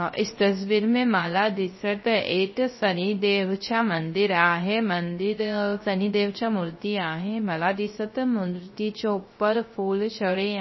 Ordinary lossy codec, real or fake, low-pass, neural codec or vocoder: MP3, 24 kbps; fake; 7.2 kHz; codec, 16 kHz, 0.3 kbps, FocalCodec